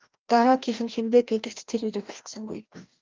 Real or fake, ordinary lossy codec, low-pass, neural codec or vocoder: fake; Opus, 24 kbps; 7.2 kHz; codec, 16 kHz, 1 kbps, FreqCodec, larger model